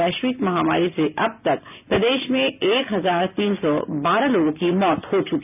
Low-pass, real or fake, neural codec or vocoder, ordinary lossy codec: 3.6 kHz; real; none; none